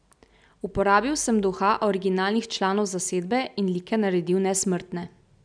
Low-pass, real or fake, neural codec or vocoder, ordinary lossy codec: 9.9 kHz; real; none; none